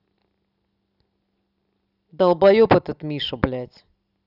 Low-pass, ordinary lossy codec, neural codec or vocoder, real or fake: 5.4 kHz; none; none; real